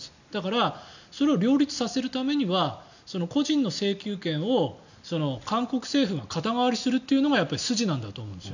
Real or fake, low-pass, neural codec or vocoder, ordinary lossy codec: real; 7.2 kHz; none; none